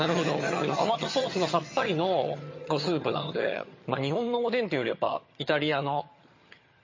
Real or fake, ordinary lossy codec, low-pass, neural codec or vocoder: fake; MP3, 32 kbps; 7.2 kHz; vocoder, 22.05 kHz, 80 mel bands, HiFi-GAN